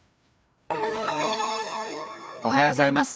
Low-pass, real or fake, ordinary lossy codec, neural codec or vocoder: none; fake; none; codec, 16 kHz, 2 kbps, FreqCodec, larger model